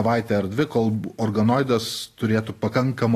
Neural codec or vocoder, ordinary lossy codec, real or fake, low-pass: none; AAC, 48 kbps; real; 14.4 kHz